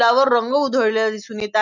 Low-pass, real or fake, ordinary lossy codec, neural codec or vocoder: 7.2 kHz; real; none; none